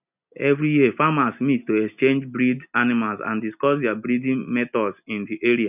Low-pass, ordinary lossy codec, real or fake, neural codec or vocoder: 3.6 kHz; none; real; none